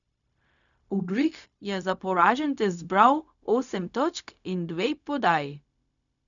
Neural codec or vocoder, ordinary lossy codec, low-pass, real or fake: codec, 16 kHz, 0.4 kbps, LongCat-Audio-Codec; none; 7.2 kHz; fake